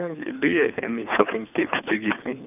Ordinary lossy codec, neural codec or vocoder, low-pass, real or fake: none; codec, 24 kHz, 3 kbps, HILCodec; 3.6 kHz; fake